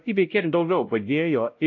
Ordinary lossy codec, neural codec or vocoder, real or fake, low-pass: AAC, 48 kbps; codec, 16 kHz, 0.5 kbps, X-Codec, WavLM features, trained on Multilingual LibriSpeech; fake; 7.2 kHz